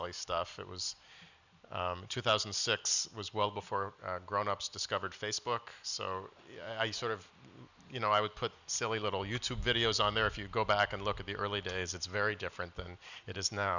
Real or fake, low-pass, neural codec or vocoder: real; 7.2 kHz; none